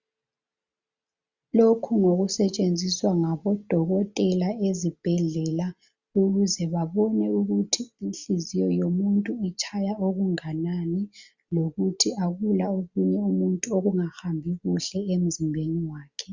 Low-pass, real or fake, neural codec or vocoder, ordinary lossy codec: 7.2 kHz; real; none; Opus, 64 kbps